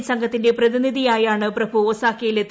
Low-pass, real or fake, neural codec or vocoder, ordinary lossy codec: none; real; none; none